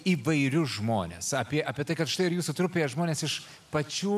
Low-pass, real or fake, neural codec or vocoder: 14.4 kHz; real; none